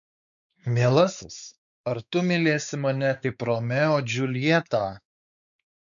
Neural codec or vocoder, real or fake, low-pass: codec, 16 kHz, 4 kbps, X-Codec, WavLM features, trained on Multilingual LibriSpeech; fake; 7.2 kHz